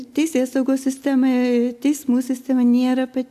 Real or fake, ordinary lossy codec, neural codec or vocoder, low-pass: real; AAC, 96 kbps; none; 14.4 kHz